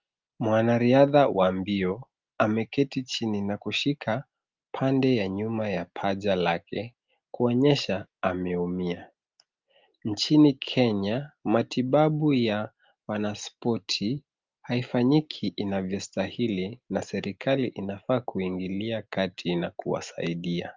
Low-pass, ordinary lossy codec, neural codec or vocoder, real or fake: 7.2 kHz; Opus, 24 kbps; none; real